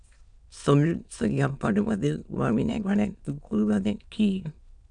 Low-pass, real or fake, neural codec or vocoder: 9.9 kHz; fake; autoencoder, 22.05 kHz, a latent of 192 numbers a frame, VITS, trained on many speakers